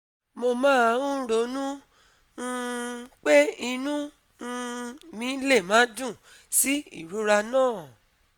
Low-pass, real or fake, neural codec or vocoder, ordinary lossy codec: none; real; none; none